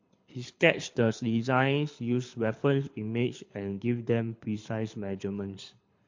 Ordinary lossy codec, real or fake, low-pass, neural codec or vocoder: MP3, 48 kbps; fake; 7.2 kHz; codec, 24 kHz, 6 kbps, HILCodec